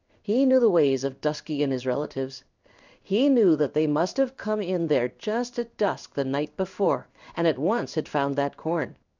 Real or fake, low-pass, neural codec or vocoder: fake; 7.2 kHz; codec, 16 kHz in and 24 kHz out, 1 kbps, XY-Tokenizer